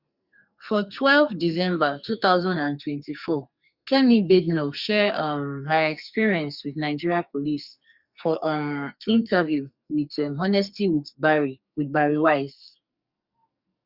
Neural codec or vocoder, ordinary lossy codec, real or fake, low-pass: codec, 44.1 kHz, 2.6 kbps, SNAC; Opus, 64 kbps; fake; 5.4 kHz